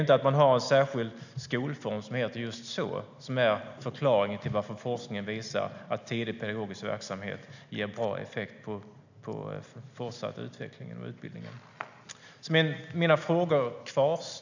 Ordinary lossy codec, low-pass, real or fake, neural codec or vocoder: none; 7.2 kHz; real; none